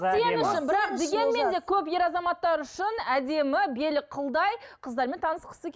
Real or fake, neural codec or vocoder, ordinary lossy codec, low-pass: real; none; none; none